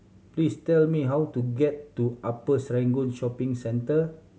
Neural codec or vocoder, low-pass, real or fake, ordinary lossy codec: none; none; real; none